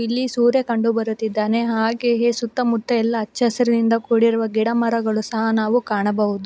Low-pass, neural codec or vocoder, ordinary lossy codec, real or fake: none; none; none; real